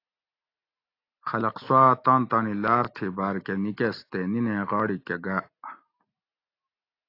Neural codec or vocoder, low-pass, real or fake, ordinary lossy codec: none; 5.4 kHz; real; AAC, 32 kbps